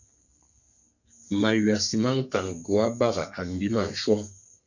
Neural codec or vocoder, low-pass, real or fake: codec, 32 kHz, 1.9 kbps, SNAC; 7.2 kHz; fake